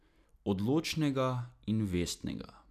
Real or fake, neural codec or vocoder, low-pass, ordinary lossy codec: real; none; 14.4 kHz; none